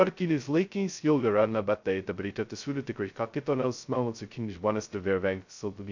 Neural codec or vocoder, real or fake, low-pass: codec, 16 kHz, 0.2 kbps, FocalCodec; fake; 7.2 kHz